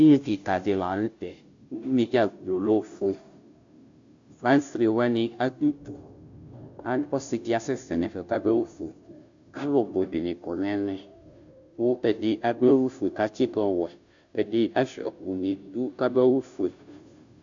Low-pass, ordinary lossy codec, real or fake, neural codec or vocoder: 7.2 kHz; AAC, 64 kbps; fake; codec, 16 kHz, 0.5 kbps, FunCodec, trained on Chinese and English, 25 frames a second